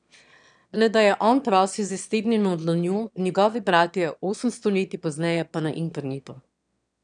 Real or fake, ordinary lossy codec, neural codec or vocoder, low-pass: fake; none; autoencoder, 22.05 kHz, a latent of 192 numbers a frame, VITS, trained on one speaker; 9.9 kHz